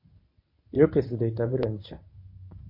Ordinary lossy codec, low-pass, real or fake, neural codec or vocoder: MP3, 32 kbps; 5.4 kHz; fake; codec, 24 kHz, 0.9 kbps, WavTokenizer, medium speech release version 1